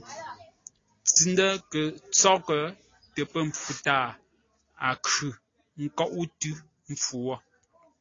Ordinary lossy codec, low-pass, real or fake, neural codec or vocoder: AAC, 32 kbps; 7.2 kHz; real; none